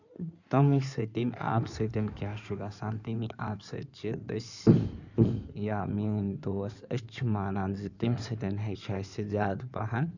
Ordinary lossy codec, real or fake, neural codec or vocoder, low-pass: none; fake; codec, 16 kHz in and 24 kHz out, 2.2 kbps, FireRedTTS-2 codec; 7.2 kHz